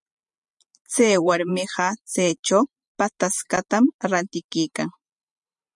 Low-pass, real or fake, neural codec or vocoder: 10.8 kHz; fake; vocoder, 44.1 kHz, 128 mel bands every 512 samples, BigVGAN v2